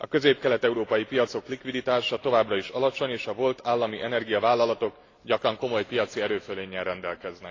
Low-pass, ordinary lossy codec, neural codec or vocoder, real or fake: 7.2 kHz; AAC, 32 kbps; none; real